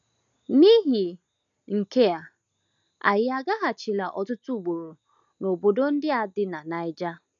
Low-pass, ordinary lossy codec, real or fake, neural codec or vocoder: 7.2 kHz; none; real; none